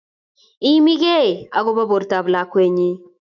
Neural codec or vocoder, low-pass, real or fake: autoencoder, 48 kHz, 128 numbers a frame, DAC-VAE, trained on Japanese speech; 7.2 kHz; fake